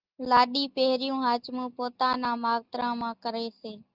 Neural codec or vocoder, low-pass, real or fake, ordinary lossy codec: none; 5.4 kHz; real; Opus, 24 kbps